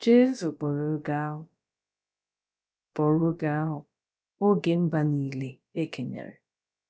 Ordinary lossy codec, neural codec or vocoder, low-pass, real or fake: none; codec, 16 kHz, about 1 kbps, DyCAST, with the encoder's durations; none; fake